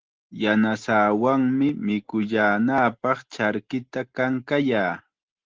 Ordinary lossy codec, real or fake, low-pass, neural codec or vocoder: Opus, 16 kbps; real; 7.2 kHz; none